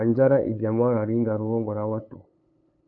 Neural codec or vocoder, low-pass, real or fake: codec, 16 kHz, 8 kbps, FunCodec, trained on LibriTTS, 25 frames a second; 7.2 kHz; fake